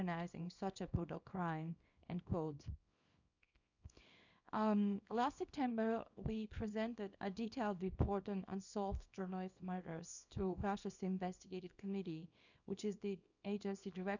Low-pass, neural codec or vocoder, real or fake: 7.2 kHz; codec, 24 kHz, 0.9 kbps, WavTokenizer, small release; fake